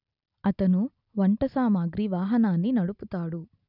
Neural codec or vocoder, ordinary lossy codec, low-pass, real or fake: none; none; 5.4 kHz; real